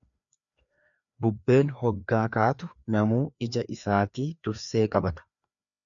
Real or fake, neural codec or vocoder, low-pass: fake; codec, 16 kHz, 2 kbps, FreqCodec, larger model; 7.2 kHz